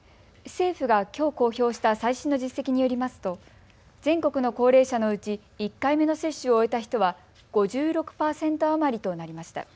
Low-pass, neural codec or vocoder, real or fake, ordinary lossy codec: none; none; real; none